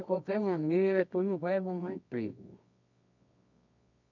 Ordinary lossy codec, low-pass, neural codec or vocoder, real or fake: none; 7.2 kHz; codec, 24 kHz, 0.9 kbps, WavTokenizer, medium music audio release; fake